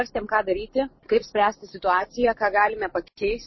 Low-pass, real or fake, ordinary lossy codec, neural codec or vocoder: 7.2 kHz; real; MP3, 24 kbps; none